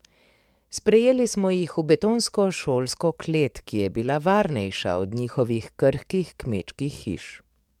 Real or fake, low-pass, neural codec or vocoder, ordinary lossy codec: fake; 19.8 kHz; codec, 44.1 kHz, 7.8 kbps, DAC; MP3, 96 kbps